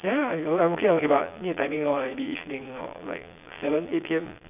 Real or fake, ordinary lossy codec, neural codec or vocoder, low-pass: fake; none; vocoder, 22.05 kHz, 80 mel bands, Vocos; 3.6 kHz